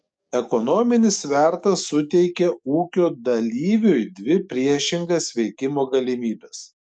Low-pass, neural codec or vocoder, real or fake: 9.9 kHz; codec, 44.1 kHz, 7.8 kbps, DAC; fake